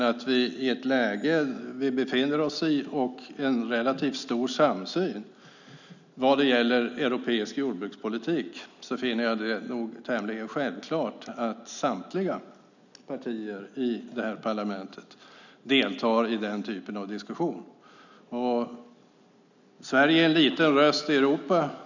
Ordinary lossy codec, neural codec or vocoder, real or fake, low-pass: none; none; real; 7.2 kHz